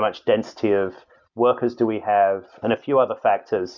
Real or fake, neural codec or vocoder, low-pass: real; none; 7.2 kHz